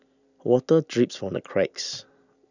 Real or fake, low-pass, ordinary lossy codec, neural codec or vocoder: real; 7.2 kHz; none; none